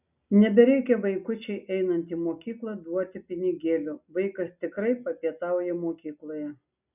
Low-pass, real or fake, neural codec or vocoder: 3.6 kHz; real; none